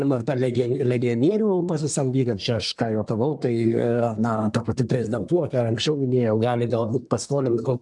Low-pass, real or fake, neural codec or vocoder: 10.8 kHz; fake; codec, 24 kHz, 1 kbps, SNAC